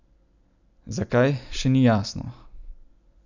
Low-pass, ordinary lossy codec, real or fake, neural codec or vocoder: 7.2 kHz; none; real; none